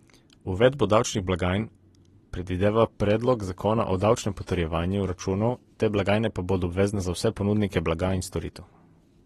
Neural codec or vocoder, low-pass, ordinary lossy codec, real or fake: none; 19.8 kHz; AAC, 32 kbps; real